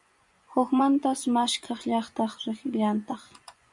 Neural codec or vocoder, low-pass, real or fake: vocoder, 24 kHz, 100 mel bands, Vocos; 10.8 kHz; fake